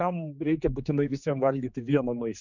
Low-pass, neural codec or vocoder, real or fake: 7.2 kHz; codec, 16 kHz, 2 kbps, X-Codec, HuBERT features, trained on general audio; fake